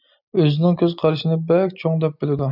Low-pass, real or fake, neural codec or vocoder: 5.4 kHz; real; none